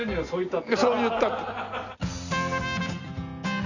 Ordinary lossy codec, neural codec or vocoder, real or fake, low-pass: none; none; real; 7.2 kHz